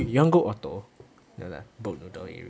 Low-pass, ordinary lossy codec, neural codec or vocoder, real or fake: none; none; none; real